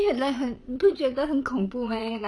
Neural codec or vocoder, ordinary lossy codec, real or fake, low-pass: vocoder, 22.05 kHz, 80 mel bands, Vocos; none; fake; none